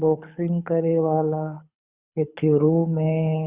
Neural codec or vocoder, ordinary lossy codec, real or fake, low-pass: codec, 24 kHz, 6 kbps, HILCodec; Opus, 64 kbps; fake; 3.6 kHz